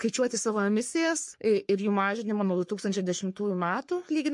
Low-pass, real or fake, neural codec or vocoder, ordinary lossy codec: 10.8 kHz; fake; codec, 44.1 kHz, 3.4 kbps, Pupu-Codec; MP3, 48 kbps